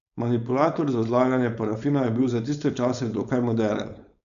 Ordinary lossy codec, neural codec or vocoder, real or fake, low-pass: none; codec, 16 kHz, 4.8 kbps, FACodec; fake; 7.2 kHz